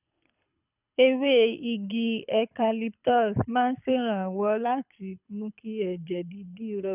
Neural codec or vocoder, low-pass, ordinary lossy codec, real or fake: codec, 24 kHz, 6 kbps, HILCodec; 3.6 kHz; none; fake